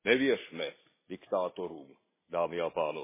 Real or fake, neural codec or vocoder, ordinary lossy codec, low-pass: real; none; MP3, 16 kbps; 3.6 kHz